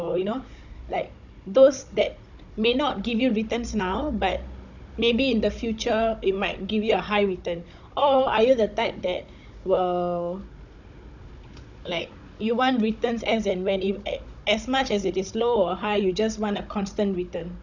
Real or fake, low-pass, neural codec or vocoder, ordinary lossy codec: fake; 7.2 kHz; codec, 16 kHz, 16 kbps, FunCodec, trained on Chinese and English, 50 frames a second; none